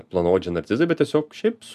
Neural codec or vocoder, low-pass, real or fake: none; 14.4 kHz; real